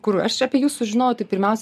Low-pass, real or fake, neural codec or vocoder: 14.4 kHz; real; none